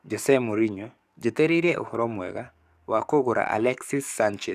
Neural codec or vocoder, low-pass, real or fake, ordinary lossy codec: codec, 44.1 kHz, 7.8 kbps, DAC; 14.4 kHz; fake; none